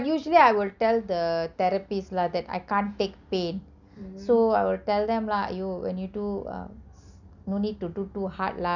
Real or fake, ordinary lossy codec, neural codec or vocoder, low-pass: real; none; none; 7.2 kHz